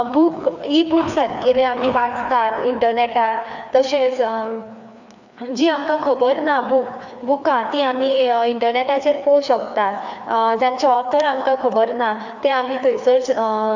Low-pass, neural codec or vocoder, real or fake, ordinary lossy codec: 7.2 kHz; codec, 16 kHz, 2 kbps, FreqCodec, larger model; fake; none